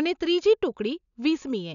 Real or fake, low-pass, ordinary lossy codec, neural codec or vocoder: real; 7.2 kHz; none; none